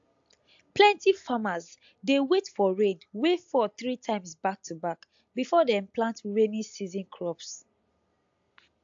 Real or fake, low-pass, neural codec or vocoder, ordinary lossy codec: real; 7.2 kHz; none; AAC, 64 kbps